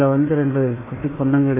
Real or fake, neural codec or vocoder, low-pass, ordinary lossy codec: fake; codec, 16 kHz, 1 kbps, FunCodec, trained on Chinese and English, 50 frames a second; 3.6 kHz; MP3, 16 kbps